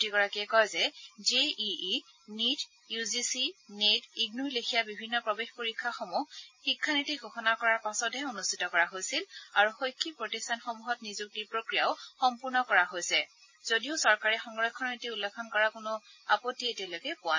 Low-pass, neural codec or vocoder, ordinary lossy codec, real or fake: 7.2 kHz; none; MP3, 32 kbps; real